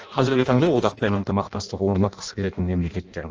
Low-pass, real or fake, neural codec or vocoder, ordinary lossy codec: 7.2 kHz; fake; codec, 16 kHz in and 24 kHz out, 0.6 kbps, FireRedTTS-2 codec; Opus, 24 kbps